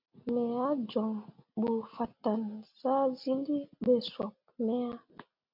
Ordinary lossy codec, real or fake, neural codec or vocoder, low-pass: MP3, 48 kbps; real; none; 5.4 kHz